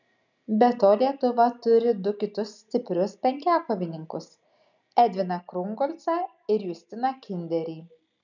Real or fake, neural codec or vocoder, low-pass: real; none; 7.2 kHz